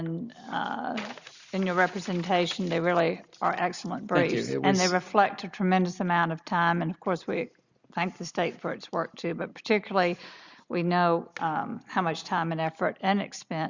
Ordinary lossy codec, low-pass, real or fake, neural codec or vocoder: Opus, 64 kbps; 7.2 kHz; real; none